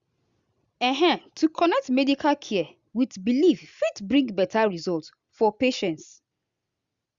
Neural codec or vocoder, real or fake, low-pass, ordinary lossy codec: none; real; 7.2 kHz; Opus, 64 kbps